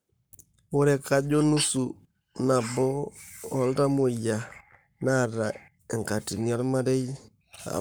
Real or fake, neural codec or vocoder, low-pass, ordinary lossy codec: fake; codec, 44.1 kHz, 7.8 kbps, Pupu-Codec; none; none